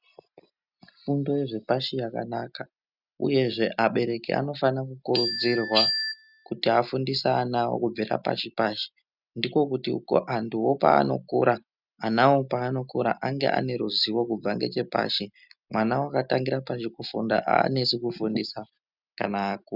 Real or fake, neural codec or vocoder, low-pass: real; none; 5.4 kHz